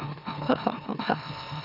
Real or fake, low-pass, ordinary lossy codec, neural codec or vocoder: fake; 5.4 kHz; none; autoencoder, 44.1 kHz, a latent of 192 numbers a frame, MeloTTS